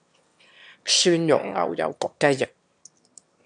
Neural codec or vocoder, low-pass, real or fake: autoencoder, 22.05 kHz, a latent of 192 numbers a frame, VITS, trained on one speaker; 9.9 kHz; fake